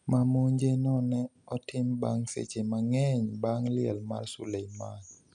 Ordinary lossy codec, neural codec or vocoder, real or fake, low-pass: none; none; real; 10.8 kHz